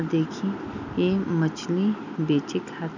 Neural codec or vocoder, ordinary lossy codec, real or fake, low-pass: none; none; real; 7.2 kHz